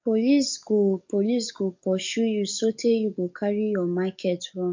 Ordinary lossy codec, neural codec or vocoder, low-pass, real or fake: MP3, 48 kbps; codec, 44.1 kHz, 7.8 kbps, DAC; 7.2 kHz; fake